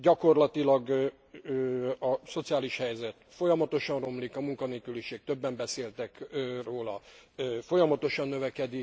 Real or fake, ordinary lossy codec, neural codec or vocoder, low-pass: real; none; none; none